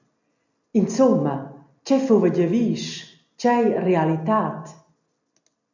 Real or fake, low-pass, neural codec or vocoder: real; 7.2 kHz; none